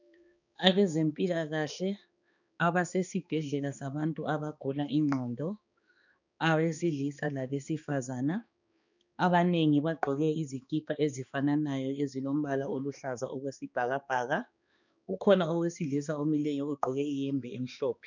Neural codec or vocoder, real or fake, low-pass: codec, 16 kHz, 2 kbps, X-Codec, HuBERT features, trained on balanced general audio; fake; 7.2 kHz